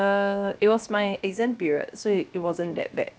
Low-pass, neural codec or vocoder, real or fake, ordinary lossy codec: none; codec, 16 kHz, 0.7 kbps, FocalCodec; fake; none